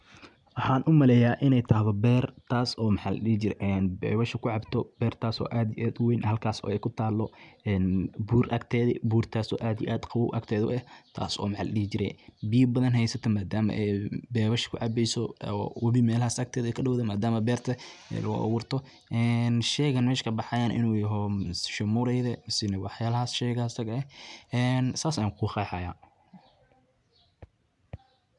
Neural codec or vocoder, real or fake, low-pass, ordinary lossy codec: none; real; 10.8 kHz; none